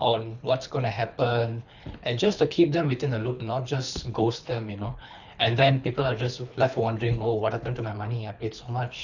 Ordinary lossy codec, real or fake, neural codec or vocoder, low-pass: none; fake; codec, 24 kHz, 3 kbps, HILCodec; 7.2 kHz